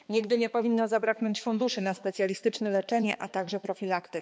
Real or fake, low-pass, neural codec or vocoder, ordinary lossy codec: fake; none; codec, 16 kHz, 2 kbps, X-Codec, HuBERT features, trained on balanced general audio; none